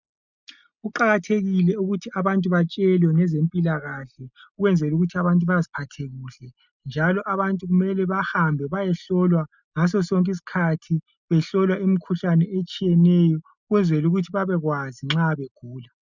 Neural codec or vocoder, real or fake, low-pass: none; real; 7.2 kHz